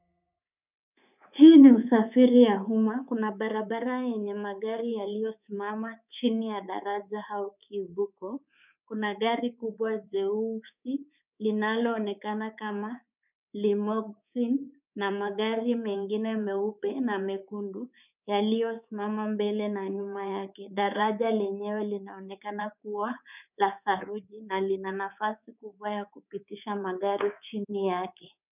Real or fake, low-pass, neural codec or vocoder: fake; 3.6 kHz; codec, 24 kHz, 3.1 kbps, DualCodec